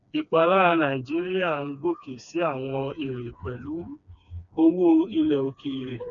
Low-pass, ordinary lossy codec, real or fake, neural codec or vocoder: 7.2 kHz; none; fake; codec, 16 kHz, 2 kbps, FreqCodec, smaller model